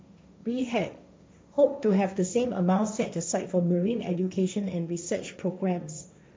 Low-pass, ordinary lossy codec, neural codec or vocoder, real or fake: none; none; codec, 16 kHz, 1.1 kbps, Voila-Tokenizer; fake